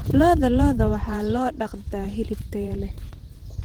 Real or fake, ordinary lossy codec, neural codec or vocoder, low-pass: fake; Opus, 16 kbps; vocoder, 44.1 kHz, 128 mel bands every 512 samples, BigVGAN v2; 19.8 kHz